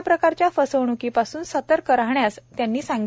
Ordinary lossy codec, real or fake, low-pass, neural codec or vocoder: none; real; none; none